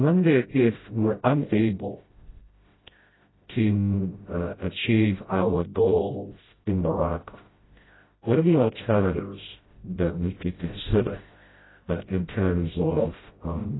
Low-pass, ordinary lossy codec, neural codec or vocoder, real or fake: 7.2 kHz; AAC, 16 kbps; codec, 16 kHz, 0.5 kbps, FreqCodec, smaller model; fake